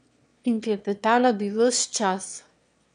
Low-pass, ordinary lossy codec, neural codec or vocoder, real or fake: 9.9 kHz; none; autoencoder, 22.05 kHz, a latent of 192 numbers a frame, VITS, trained on one speaker; fake